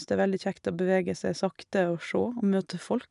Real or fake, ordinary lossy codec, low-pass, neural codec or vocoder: real; none; 10.8 kHz; none